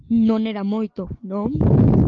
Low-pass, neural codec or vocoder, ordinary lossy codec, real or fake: 7.2 kHz; none; Opus, 32 kbps; real